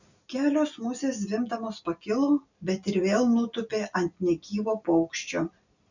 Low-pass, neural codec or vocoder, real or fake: 7.2 kHz; none; real